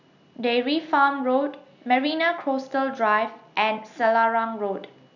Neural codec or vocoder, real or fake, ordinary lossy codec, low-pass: none; real; none; 7.2 kHz